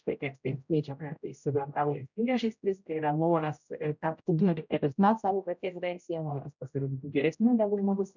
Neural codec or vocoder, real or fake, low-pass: codec, 16 kHz, 0.5 kbps, X-Codec, HuBERT features, trained on general audio; fake; 7.2 kHz